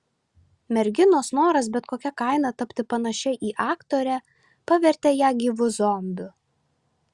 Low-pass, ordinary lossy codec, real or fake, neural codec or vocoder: 10.8 kHz; Opus, 64 kbps; real; none